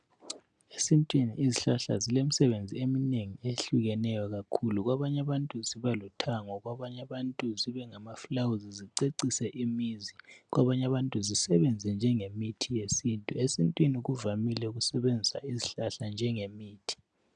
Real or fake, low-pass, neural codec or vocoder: real; 9.9 kHz; none